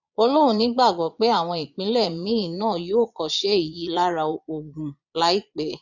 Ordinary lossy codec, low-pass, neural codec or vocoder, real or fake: none; 7.2 kHz; vocoder, 22.05 kHz, 80 mel bands, Vocos; fake